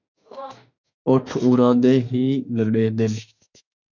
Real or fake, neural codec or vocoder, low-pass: fake; autoencoder, 48 kHz, 32 numbers a frame, DAC-VAE, trained on Japanese speech; 7.2 kHz